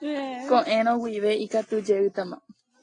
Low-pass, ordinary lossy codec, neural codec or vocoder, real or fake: 9.9 kHz; AAC, 32 kbps; none; real